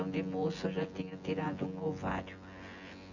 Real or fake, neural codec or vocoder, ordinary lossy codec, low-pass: fake; vocoder, 24 kHz, 100 mel bands, Vocos; none; 7.2 kHz